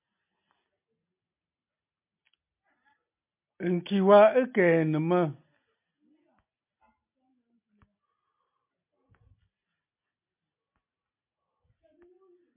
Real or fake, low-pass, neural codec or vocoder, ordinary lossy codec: real; 3.6 kHz; none; MP3, 32 kbps